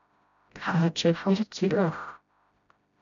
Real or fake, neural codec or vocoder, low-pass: fake; codec, 16 kHz, 0.5 kbps, FreqCodec, smaller model; 7.2 kHz